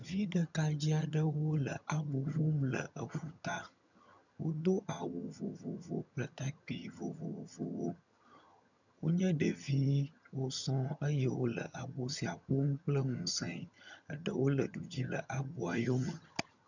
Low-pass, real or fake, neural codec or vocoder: 7.2 kHz; fake; vocoder, 22.05 kHz, 80 mel bands, HiFi-GAN